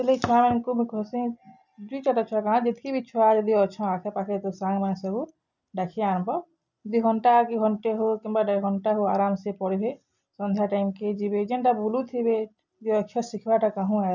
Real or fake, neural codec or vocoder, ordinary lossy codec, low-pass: real; none; none; 7.2 kHz